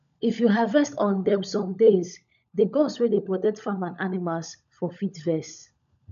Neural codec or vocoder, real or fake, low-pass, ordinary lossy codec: codec, 16 kHz, 16 kbps, FunCodec, trained on LibriTTS, 50 frames a second; fake; 7.2 kHz; none